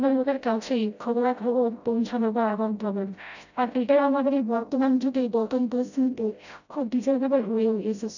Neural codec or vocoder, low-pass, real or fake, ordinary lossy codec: codec, 16 kHz, 0.5 kbps, FreqCodec, smaller model; 7.2 kHz; fake; none